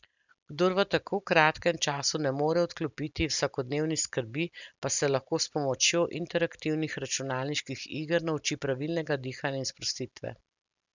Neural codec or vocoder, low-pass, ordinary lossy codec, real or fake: none; 7.2 kHz; none; real